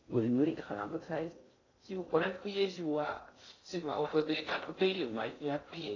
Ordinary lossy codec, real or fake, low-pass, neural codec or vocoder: AAC, 32 kbps; fake; 7.2 kHz; codec, 16 kHz in and 24 kHz out, 0.6 kbps, FocalCodec, streaming, 4096 codes